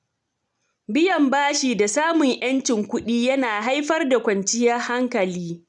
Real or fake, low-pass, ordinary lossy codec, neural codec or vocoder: real; 10.8 kHz; none; none